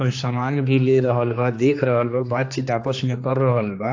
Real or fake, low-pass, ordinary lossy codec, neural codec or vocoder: fake; 7.2 kHz; AAC, 48 kbps; codec, 16 kHz, 2 kbps, X-Codec, HuBERT features, trained on general audio